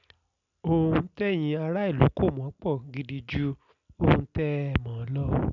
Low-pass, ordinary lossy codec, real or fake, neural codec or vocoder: 7.2 kHz; none; real; none